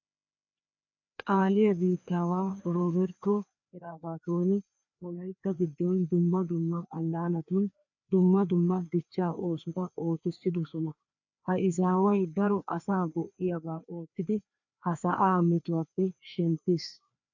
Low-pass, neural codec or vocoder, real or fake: 7.2 kHz; codec, 16 kHz, 2 kbps, FreqCodec, larger model; fake